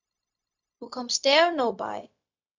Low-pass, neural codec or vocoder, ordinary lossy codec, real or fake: 7.2 kHz; codec, 16 kHz, 0.4 kbps, LongCat-Audio-Codec; AAC, 48 kbps; fake